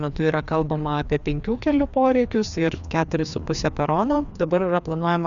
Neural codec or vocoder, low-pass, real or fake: codec, 16 kHz, 2 kbps, FreqCodec, larger model; 7.2 kHz; fake